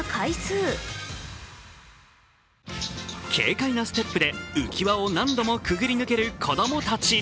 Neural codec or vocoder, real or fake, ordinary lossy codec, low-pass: none; real; none; none